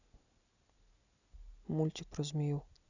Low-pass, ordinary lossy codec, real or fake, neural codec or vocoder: 7.2 kHz; none; real; none